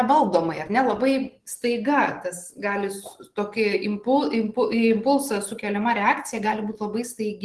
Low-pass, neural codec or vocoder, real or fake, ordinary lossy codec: 10.8 kHz; none; real; Opus, 16 kbps